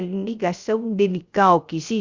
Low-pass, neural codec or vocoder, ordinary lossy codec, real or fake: 7.2 kHz; codec, 16 kHz, 0.3 kbps, FocalCodec; Opus, 64 kbps; fake